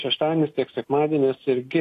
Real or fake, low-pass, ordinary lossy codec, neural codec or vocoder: real; 14.4 kHz; AAC, 48 kbps; none